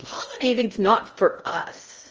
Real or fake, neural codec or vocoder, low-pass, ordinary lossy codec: fake; codec, 16 kHz in and 24 kHz out, 0.8 kbps, FocalCodec, streaming, 65536 codes; 7.2 kHz; Opus, 24 kbps